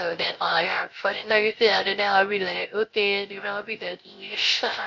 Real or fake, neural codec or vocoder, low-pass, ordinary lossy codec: fake; codec, 16 kHz, 0.3 kbps, FocalCodec; 7.2 kHz; MP3, 48 kbps